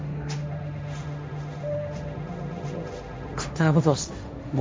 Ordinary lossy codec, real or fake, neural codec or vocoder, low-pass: none; fake; codec, 16 kHz, 1.1 kbps, Voila-Tokenizer; none